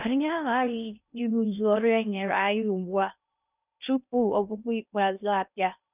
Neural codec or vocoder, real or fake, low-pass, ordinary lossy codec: codec, 16 kHz in and 24 kHz out, 0.6 kbps, FocalCodec, streaming, 2048 codes; fake; 3.6 kHz; none